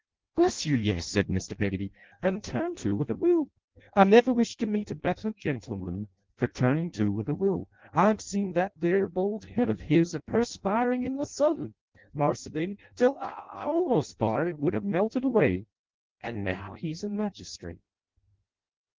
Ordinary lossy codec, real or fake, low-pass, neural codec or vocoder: Opus, 16 kbps; fake; 7.2 kHz; codec, 16 kHz in and 24 kHz out, 0.6 kbps, FireRedTTS-2 codec